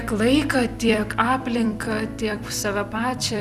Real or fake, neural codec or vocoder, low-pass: fake; vocoder, 44.1 kHz, 128 mel bands every 256 samples, BigVGAN v2; 14.4 kHz